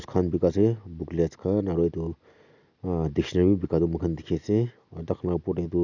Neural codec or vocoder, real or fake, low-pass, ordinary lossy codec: none; real; 7.2 kHz; none